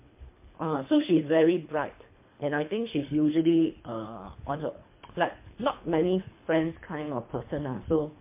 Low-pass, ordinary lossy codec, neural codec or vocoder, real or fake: 3.6 kHz; MP3, 24 kbps; codec, 24 kHz, 3 kbps, HILCodec; fake